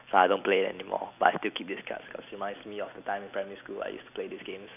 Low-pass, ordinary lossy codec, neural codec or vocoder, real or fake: 3.6 kHz; none; none; real